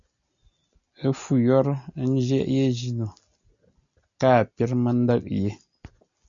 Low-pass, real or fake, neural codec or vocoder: 7.2 kHz; real; none